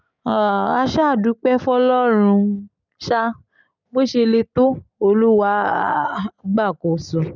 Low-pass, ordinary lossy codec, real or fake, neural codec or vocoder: 7.2 kHz; none; real; none